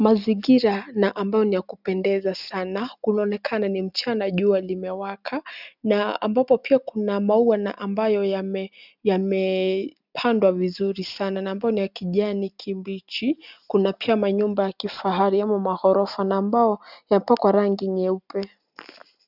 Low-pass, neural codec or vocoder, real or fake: 5.4 kHz; none; real